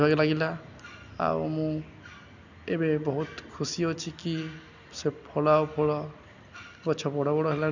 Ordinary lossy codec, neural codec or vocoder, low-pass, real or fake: none; none; 7.2 kHz; real